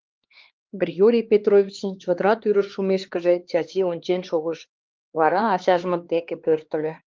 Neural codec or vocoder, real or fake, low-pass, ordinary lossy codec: codec, 16 kHz, 2 kbps, X-Codec, HuBERT features, trained on LibriSpeech; fake; 7.2 kHz; Opus, 32 kbps